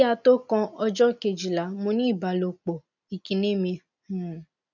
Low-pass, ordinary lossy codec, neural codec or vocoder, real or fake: 7.2 kHz; none; none; real